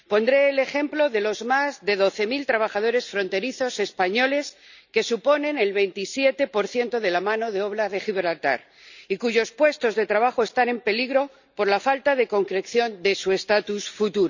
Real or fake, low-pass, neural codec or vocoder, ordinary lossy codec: real; 7.2 kHz; none; none